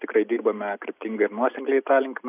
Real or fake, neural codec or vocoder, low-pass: real; none; 3.6 kHz